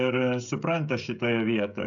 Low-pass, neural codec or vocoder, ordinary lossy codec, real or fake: 7.2 kHz; codec, 16 kHz, 16 kbps, FreqCodec, smaller model; MP3, 96 kbps; fake